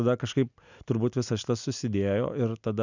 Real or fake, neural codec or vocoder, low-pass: real; none; 7.2 kHz